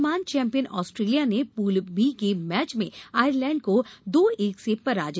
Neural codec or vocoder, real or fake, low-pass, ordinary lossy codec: none; real; none; none